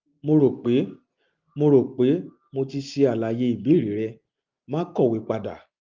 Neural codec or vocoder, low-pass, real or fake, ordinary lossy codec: none; 7.2 kHz; real; Opus, 32 kbps